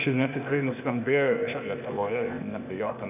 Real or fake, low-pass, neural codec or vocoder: fake; 3.6 kHz; codec, 16 kHz, 0.8 kbps, ZipCodec